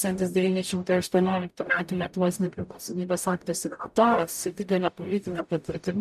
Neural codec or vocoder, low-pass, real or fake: codec, 44.1 kHz, 0.9 kbps, DAC; 14.4 kHz; fake